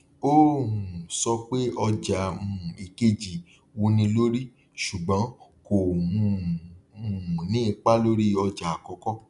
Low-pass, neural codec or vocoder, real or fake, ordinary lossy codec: 10.8 kHz; none; real; none